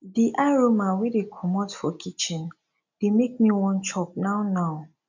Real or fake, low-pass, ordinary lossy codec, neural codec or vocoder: real; 7.2 kHz; none; none